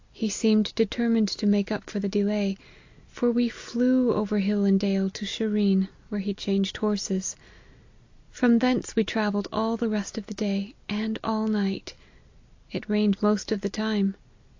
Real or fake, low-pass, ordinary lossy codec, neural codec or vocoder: real; 7.2 kHz; AAC, 48 kbps; none